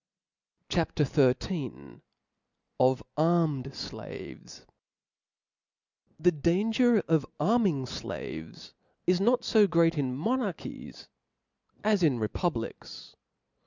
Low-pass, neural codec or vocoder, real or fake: 7.2 kHz; none; real